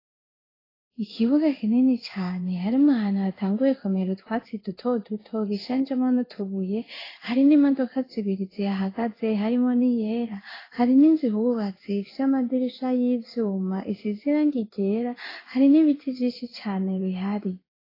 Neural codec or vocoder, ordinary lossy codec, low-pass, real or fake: codec, 16 kHz in and 24 kHz out, 1 kbps, XY-Tokenizer; AAC, 24 kbps; 5.4 kHz; fake